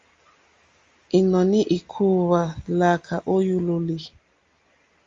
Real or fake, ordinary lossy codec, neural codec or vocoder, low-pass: real; Opus, 32 kbps; none; 7.2 kHz